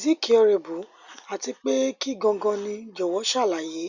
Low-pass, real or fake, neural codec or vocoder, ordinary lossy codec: 7.2 kHz; real; none; Opus, 64 kbps